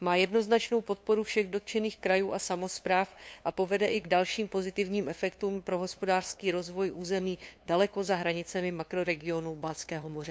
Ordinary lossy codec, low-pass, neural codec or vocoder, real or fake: none; none; codec, 16 kHz, 2 kbps, FunCodec, trained on LibriTTS, 25 frames a second; fake